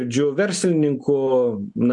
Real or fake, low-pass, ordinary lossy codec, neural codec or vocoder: real; 10.8 kHz; MP3, 64 kbps; none